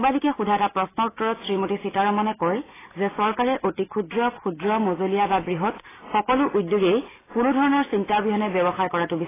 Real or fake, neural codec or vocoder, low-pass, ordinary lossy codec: real; none; 3.6 kHz; AAC, 16 kbps